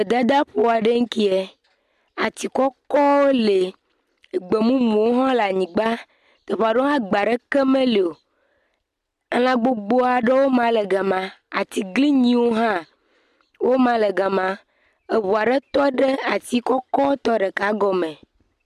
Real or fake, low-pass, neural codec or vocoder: real; 14.4 kHz; none